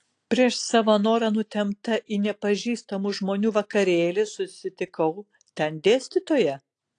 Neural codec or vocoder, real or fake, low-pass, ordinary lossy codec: none; real; 9.9 kHz; AAC, 48 kbps